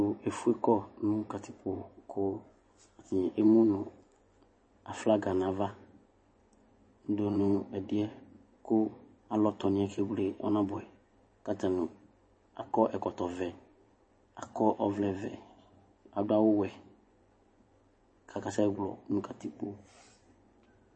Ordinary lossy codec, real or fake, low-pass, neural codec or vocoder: MP3, 32 kbps; fake; 9.9 kHz; vocoder, 24 kHz, 100 mel bands, Vocos